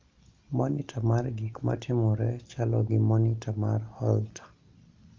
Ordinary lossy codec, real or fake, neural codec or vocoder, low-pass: Opus, 32 kbps; real; none; 7.2 kHz